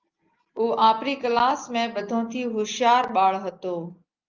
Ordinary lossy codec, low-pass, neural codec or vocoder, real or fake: Opus, 24 kbps; 7.2 kHz; none; real